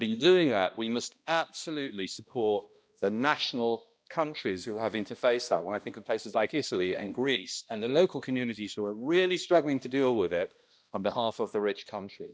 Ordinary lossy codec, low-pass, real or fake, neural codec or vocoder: none; none; fake; codec, 16 kHz, 1 kbps, X-Codec, HuBERT features, trained on balanced general audio